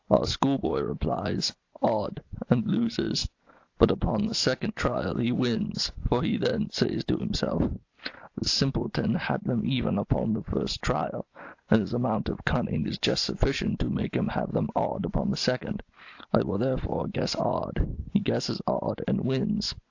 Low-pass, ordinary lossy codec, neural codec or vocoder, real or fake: 7.2 kHz; AAC, 48 kbps; none; real